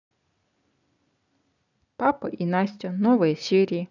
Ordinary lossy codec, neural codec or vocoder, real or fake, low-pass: none; none; real; 7.2 kHz